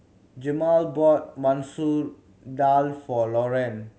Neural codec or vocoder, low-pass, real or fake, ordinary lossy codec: none; none; real; none